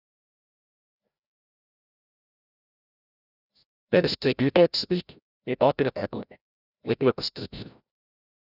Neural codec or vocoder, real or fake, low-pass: codec, 16 kHz, 0.5 kbps, FreqCodec, larger model; fake; 5.4 kHz